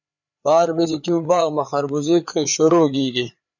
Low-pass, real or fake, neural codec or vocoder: 7.2 kHz; fake; codec, 16 kHz, 4 kbps, FreqCodec, larger model